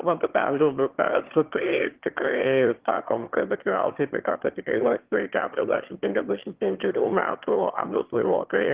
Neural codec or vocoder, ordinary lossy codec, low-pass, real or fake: autoencoder, 22.05 kHz, a latent of 192 numbers a frame, VITS, trained on one speaker; Opus, 16 kbps; 3.6 kHz; fake